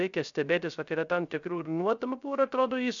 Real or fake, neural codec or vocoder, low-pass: fake; codec, 16 kHz, 0.3 kbps, FocalCodec; 7.2 kHz